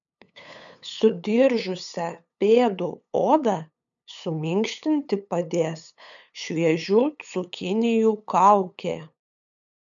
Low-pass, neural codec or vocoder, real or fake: 7.2 kHz; codec, 16 kHz, 8 kbps, FunCodec, trained on LibriTTS, 25 frames a second; fake